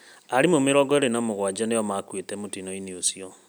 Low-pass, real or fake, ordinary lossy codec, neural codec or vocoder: none; real; none; none